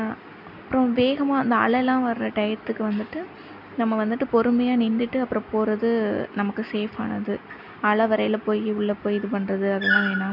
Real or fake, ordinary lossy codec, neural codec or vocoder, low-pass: real; none; none; 5.4 kHz